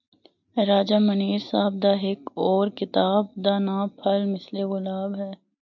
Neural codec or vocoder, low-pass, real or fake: none; 7.2 kHz; real